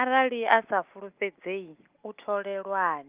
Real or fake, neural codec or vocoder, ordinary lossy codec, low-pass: real; none; Opus, 32 kbps; 3.6 kHz